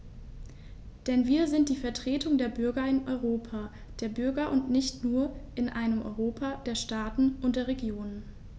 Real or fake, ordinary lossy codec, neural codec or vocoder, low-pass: real; none; none; none